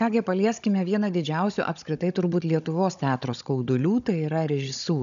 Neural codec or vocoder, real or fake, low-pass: codec, 16 kHz, 16 kbps, FunCodec, trained on Chinese and English, 50 frames a second; fake; 7.2 kHz